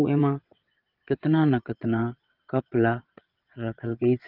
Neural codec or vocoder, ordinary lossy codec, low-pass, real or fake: vocoder, 22.05 kHz, 80 mel bands, WaveNeXt; Opus, 32 kbps; 5.4 kHz; fake